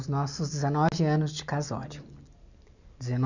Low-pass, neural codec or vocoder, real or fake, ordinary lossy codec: 7.2 kHz; none; real; none